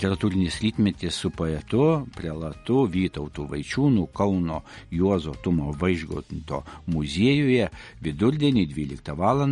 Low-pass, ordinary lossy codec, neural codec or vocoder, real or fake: 19.8 kHz; MP3, 48 kbps; none; real